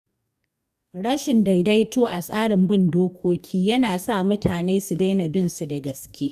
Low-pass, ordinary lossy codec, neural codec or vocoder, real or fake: 14.4 kHz; none; codec, 44.1 kHz, 2.6 kbps, DAC; fake